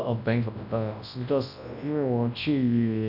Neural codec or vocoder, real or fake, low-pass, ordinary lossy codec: codec, 24 kHz, 0.9 kbps, WavTokenizer, large speech release; fake; 5.4 kHz; none